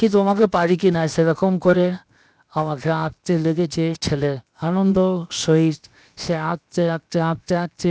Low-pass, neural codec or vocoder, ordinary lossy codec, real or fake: none; codec, 16 kHz, 0.7 kbps, FocalCodec; none; fake